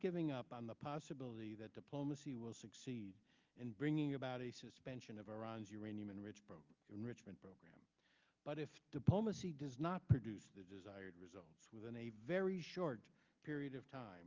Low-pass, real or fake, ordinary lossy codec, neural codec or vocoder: 7.2 kHz; real; Opus, 24 kbps; none